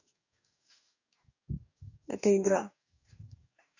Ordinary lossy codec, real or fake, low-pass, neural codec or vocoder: none; fake; 7.2 kHz; codec, 44.1 kHz, 2.6 kbps, DAC